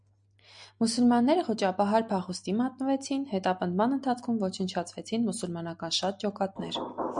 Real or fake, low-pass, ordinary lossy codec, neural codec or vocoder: real; 9.9 kHz; MP3, 96 kbps; none